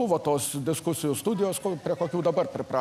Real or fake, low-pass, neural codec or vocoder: real; 14.4 kHz; none